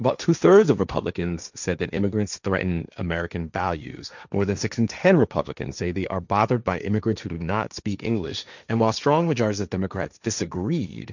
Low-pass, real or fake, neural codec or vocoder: 7.2 kHz; fake; codec, 16 kHz, 1.1 kbps, Voila-Tokenizer